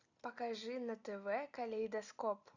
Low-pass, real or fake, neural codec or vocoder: 7.2 kHz; real; none